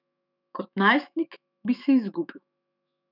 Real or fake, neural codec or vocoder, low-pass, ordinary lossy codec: fake; autoencoder, 48 kHz, 128 numbers a frame, DAC-VAE, trained on Japanese speech; 5.4 kHz; none